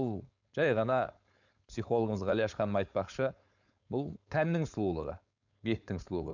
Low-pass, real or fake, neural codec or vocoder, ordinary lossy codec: 7.2 kHz; fake; codec, 16 kHz, 4.8 kbps, FACodec; none